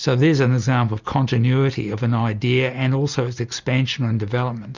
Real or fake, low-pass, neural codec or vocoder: real; 7.2 kHz; none